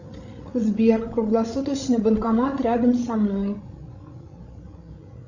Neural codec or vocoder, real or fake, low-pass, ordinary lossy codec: codec, 16 kHz, 16 kbps, FreqCodec, larger model; fake; 7.2 kHz; Opus, 64 kbps